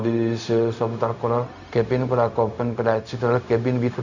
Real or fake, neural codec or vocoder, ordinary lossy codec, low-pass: fake; codec, 16 kHz, 0.4 kbps, LongCat-Audio-Codec; none; 7.2 kHz